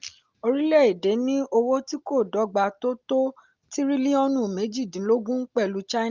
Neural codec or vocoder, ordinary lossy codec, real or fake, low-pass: none; Opus, 32 kbps; real; 7.2 kHz